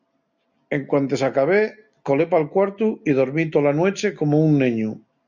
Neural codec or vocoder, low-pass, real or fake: none; 7.2 kHz; real